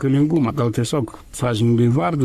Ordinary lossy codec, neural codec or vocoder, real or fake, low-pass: Opus, 64 kbps; codec, 44.1 kHz, 3.4 kbps, Pupu-Codec; fake; 14.4 kHz